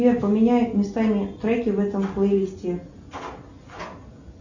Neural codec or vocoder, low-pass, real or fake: none; 7.2 kHz; real